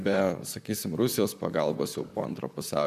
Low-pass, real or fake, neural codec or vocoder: 14.4 kHz; fake; vocoder, 44.1 kHz, 128 mel bands, Pupu-Vocoder